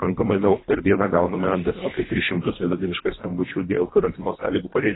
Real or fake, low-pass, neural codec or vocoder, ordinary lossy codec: fake; 7.2 kHz; codec, 24 kHz, 1.5 kbps, HILCodec; AAC, 16 kbps